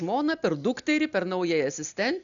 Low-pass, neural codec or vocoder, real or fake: 7.2 kHz; none; real